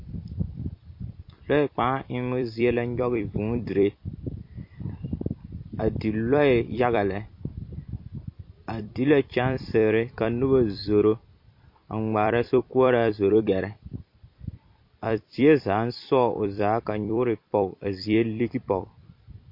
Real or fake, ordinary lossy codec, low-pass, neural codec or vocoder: fake; MP3, 32 kbps; 5.4 kHz; vocoder, 44.1 kHz, 128 mel bands every 256 samples, BigVGAN v2